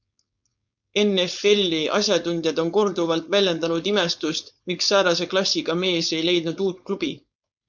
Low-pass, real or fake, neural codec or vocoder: 7.2 kHz; fake; codec, 16 kHz, 4.8 kbps, FACodec